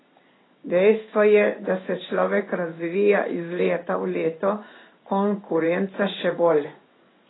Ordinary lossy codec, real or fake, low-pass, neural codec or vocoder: AAC, 16 kbps; fake; 7.2 kHz; codec, 16 kHz in and 24 kHz out, 1 kbps, XY-Tokenizer